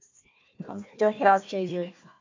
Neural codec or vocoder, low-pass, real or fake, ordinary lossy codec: codec, 16 kHz, 1 kbps, FunCodec, trained on Chinese and English, 50 frames a second; 7.2 kHz; fake; MP3, 64 kbps